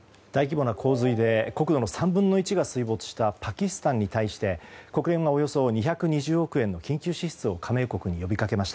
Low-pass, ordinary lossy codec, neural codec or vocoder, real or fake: none; none; none; real